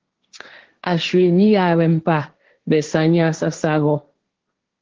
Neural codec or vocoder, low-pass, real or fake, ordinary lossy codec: codec, 16 kHz, 1.1 kbps, Voila-Tokenizer; 7.2 kHz; fake; Opus, 16 kbps